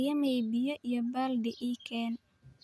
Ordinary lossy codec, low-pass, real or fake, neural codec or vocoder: none; none; real; none